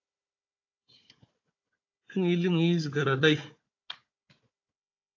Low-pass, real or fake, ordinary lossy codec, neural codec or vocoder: 7.2 kHz; fake; AAC, 48 kbps; codec, 16 kHz, 4 kbps, FunCodec, trained on Chinese and English, 50 frames a second